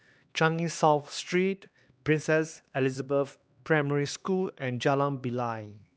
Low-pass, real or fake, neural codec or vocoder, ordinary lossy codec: none; fake; codec, 16 kHz, 2 kbps, X-Codec, HuBERT features, trained on LibriSpeech; none